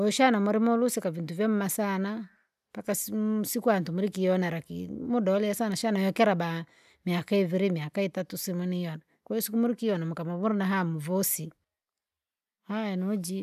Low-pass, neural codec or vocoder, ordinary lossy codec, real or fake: 14.4 kHz; none; none; real